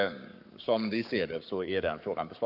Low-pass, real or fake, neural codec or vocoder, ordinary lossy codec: 5.4 kHz; fake; codec, 44.1 kHz, 7.8 kbps, Pupu-Codec; none